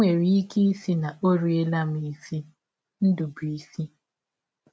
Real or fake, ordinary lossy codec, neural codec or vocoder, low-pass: real; none; none; none